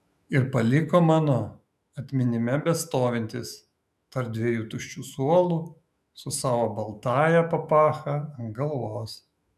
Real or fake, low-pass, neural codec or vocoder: fake; 14.4 kHz; autoencoder, 48 kHz, 128 numbers a frame, DAC-VAE, trained on Japanese speech